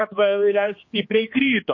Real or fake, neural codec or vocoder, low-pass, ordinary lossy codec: fake; codec, 16 kHz, 2 kbps, X-Codec, HuBERT features, trained on general audio; 7.2 kHz; MP3, 32 kbps